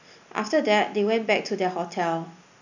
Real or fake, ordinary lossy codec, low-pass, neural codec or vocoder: real; none; 7.2 kHz; none